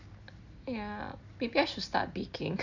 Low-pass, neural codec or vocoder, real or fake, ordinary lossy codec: 7.2 kHz; none; real; none